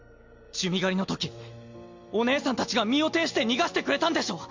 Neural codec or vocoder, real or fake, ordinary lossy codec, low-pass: none; real; MP3, 48 kbps; 7.2 kHz